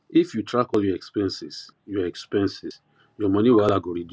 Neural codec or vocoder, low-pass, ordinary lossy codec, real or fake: none; none; none; real